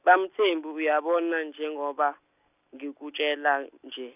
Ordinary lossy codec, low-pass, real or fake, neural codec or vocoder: none; 3.6 kHz; real; none